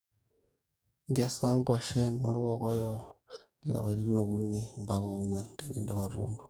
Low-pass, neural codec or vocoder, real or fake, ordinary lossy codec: none; codec, 44.1 kHz, 2.6 kbps, DAC; fake; none